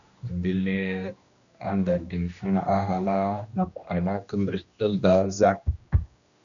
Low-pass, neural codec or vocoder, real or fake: 7.2 kHz; codec, 16 kHz, 1 kbps, X-Codec, HuBERT features, trained on general audio; fake